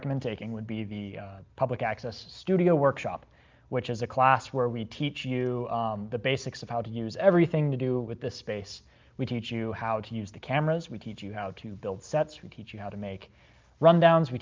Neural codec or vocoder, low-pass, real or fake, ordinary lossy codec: none; 7.2 kHz; real; Opus, 24 kbps